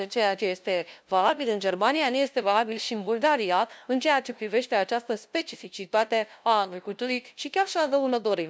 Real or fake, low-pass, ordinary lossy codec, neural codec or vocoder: fake; none; none; codec, 16 kHz, 0.5 kbps, FunCodec, trained on LibriTTS, 25 frames a second